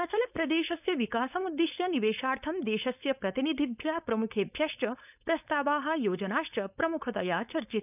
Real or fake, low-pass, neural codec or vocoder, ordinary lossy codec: fake; 3.6 kHz; codec, 16 kHz, 4.8 kbps, FACodec; none